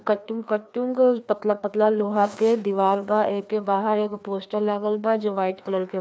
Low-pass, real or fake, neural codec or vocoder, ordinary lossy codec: none; fake; codec, 16 kHz, 2 kbps, FreqCodec, larger model; none